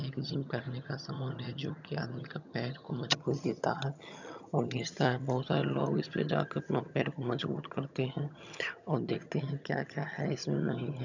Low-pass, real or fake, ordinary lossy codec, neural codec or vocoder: 7.2 kHz; fake; none; vocoder, 22.05 kHz, 80 mel bands, HiFi-GAN